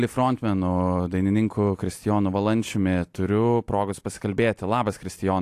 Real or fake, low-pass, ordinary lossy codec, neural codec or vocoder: real; 14.4 kHz; AAC, 64 kbps; none